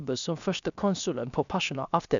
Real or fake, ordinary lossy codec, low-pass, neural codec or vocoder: fake; MP3, 96 kbps; 7.2 kHz; codec, 16 kHz, 0.7 kbps, FocalCodec